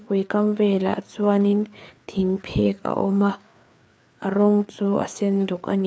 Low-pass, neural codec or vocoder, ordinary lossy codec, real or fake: none; codec, 16 kHz, 4 kbps, FreqCodec, larger model; none; fake